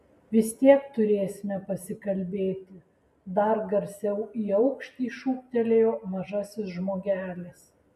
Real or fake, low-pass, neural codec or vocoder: real; 14.4 kHz; none